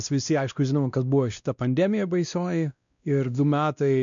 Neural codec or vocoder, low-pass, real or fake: codec, 16 kHz, 1 kbps, X-Codec, WavLM features, trained on Multilingual LibriSpeech; 7.2 kHz; fake